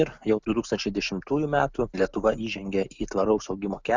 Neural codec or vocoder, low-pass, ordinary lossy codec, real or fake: none; 7.2 kHz; Opus, 64 kbps; real